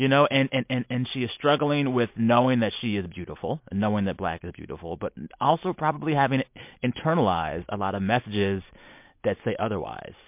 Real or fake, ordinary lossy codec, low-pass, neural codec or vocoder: real; MP3, 32 kbps; 3.6 kHz; none